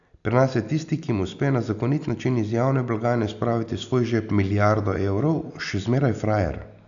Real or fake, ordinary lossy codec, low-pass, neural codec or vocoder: real; none; 7.2 kHz; none